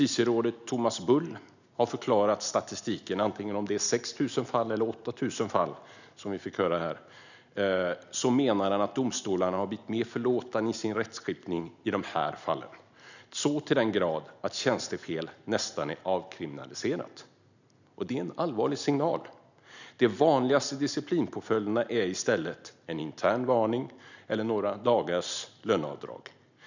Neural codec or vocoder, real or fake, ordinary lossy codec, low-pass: none; real; none; 7.2 kHz